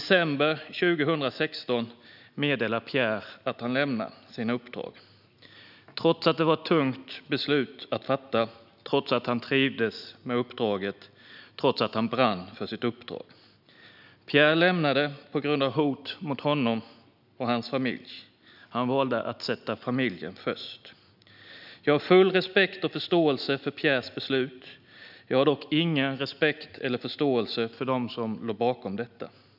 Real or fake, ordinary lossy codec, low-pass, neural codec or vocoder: real; none; 5.4 kHz; none